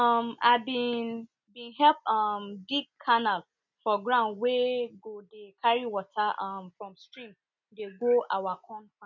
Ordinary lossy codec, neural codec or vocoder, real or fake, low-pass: none; none; real; 7.2 kHz